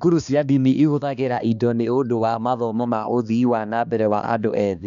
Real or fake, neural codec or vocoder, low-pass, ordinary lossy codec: fake; codec, 16 kHz, 2 kbps, X-Codec, HuBERT features, trained on balanced general audio; 7.2 kHz; none